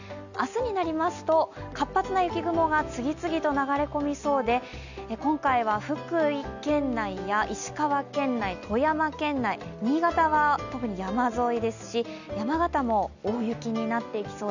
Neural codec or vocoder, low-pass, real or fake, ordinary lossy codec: none; 7.2 kHz; real; none